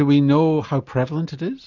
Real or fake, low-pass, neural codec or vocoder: real; 7.2 kHz; none